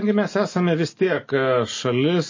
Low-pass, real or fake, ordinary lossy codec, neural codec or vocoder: 7.2 kHz; real; MP3, 32 kbps; none